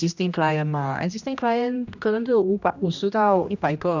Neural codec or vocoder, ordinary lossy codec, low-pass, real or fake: codec, 16 kHz, 1 kbps, X-Codec, HuBERT features, trained on general audio; none; 7.2 kHz; fake